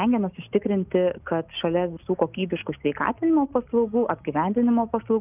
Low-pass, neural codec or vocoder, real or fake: 3.6 kHz; none; real